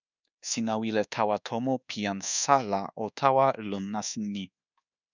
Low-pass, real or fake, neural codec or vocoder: 7.2 kHz; fake; codec, 24 kHz, 1.2 kbps, DualCodec